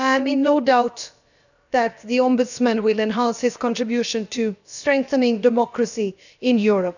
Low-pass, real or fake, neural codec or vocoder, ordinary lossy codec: 7.2 kHz; fake; codec, 16 kHz, about 1 kbps, DyCAST, with the encoder's durations; none